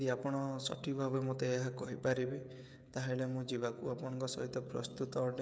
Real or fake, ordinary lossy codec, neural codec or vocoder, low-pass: fake; none; codec, 16 kHz, 16 kbps, FreqCodec, smaller model; none